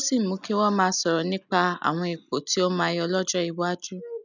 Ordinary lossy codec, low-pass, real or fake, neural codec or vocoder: none; 7.2 kHz; real; none